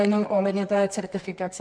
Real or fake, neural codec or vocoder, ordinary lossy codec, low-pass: fake; codec, 24 kHz, 0.9 kbps, WavTokenizer, medium music audio release; MP3, 64 kbps; 9.9 kHz